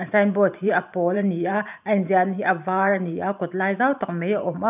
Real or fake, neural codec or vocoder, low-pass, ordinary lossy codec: fake; vocoder, 44.1 kHz, 128 mel bands every 512 samples, BigVGAN v2; 3.6 kHz; none